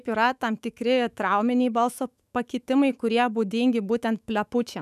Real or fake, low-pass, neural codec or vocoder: fake; 14.4 kHz; autoencoder, 48 kHz, 128 numbers a frame, DAC-VAE, trained on Japanese speech